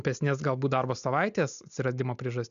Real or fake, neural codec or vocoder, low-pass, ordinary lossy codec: real; none; 7.2 kHz; AAC, 96 kbps